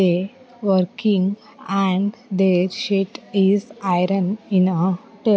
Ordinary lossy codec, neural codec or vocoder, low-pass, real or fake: none; none; none; real